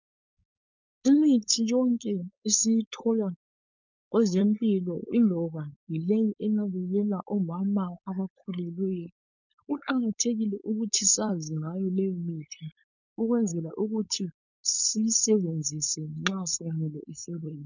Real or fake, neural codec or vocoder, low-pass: fake; codec, 16 kHz, 4.8 kbps, FACodec; 7.2 kHz